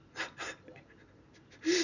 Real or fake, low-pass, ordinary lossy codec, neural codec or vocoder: real; 7.2 kHz; none; none